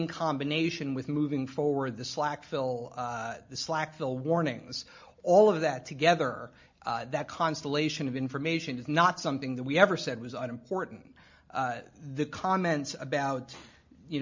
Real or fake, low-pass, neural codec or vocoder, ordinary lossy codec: real; 7.2 kHz; none; MP3, 48 kbps